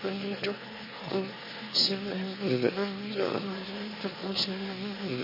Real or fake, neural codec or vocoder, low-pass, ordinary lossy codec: fake; autoencoder, 22.05 kHz, a latent of 192 numbers a frame, VITS, trained on one speaker; 5.4 kHz; MP3, 24 kbps